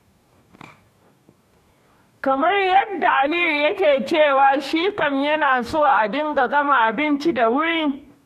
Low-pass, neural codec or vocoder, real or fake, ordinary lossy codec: 14.4 kHz; codec, 44.1 kHz, 2.6 kbps, DAC; fake; none